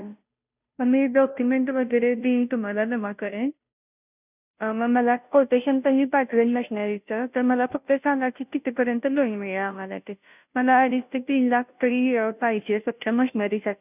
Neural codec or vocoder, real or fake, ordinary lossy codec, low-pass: codec, 16 kHz, 0.5 kbps, FunCodec, trained on Chinese and English, 25 frames a second; fake; none; 3.6 kHz